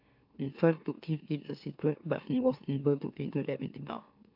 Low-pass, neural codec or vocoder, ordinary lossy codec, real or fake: 5.4 kHz; autoencoder, 44.1 kHz, a latent of 192 numbers a frame, MeloTTS; AAC, 48 kbps; fake